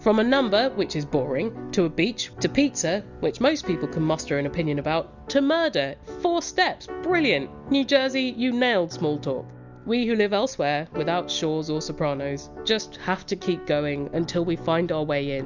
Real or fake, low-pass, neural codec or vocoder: real; 7.2 kHz; none